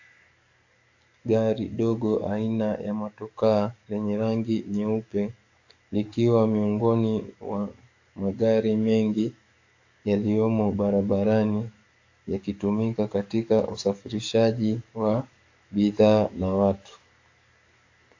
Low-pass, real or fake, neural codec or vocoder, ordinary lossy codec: 7.2 kHz; fake; codec, 44.1 kHz, 7.8 kbps, DAC; AAC, 48 kbps